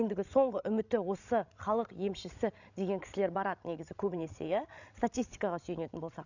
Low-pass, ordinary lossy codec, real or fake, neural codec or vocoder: 7.2 kHz; none; fake; codec, 16 kHz, 16 kbps, FreqCodec, larger model